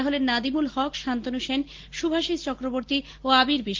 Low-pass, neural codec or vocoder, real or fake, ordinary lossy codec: 7.2 kHz; none; real; Opus, 16 kbps